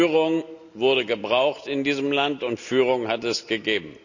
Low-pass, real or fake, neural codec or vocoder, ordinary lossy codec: 7.2 kHz; real; none; none